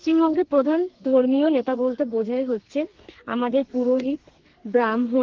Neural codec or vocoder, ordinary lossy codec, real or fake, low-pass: codec, 32 kHz, 1.9 kbps, SNAC; Opus, 16 kbps; fake; 7.2 kHz